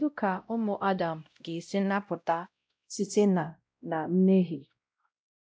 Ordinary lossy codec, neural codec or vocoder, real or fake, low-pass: none; codec, 16 kHz, 0.5 kbps, X-Codec, WavLM features, trained on Multilingual LibriSpeech; fake; none